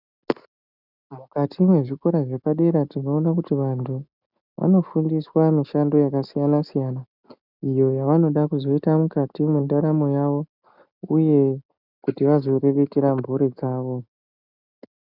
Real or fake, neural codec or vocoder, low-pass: real; none; 5.4 kHz